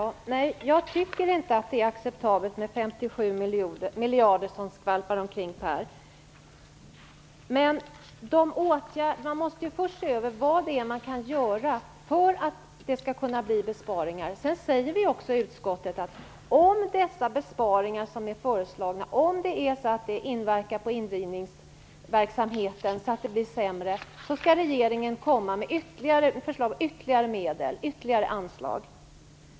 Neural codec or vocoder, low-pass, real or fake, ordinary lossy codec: none; none; real; none